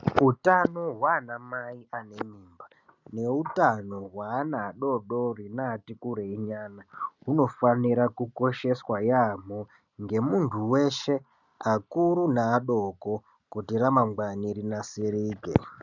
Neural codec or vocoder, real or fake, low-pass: none; real; 7.2 kHz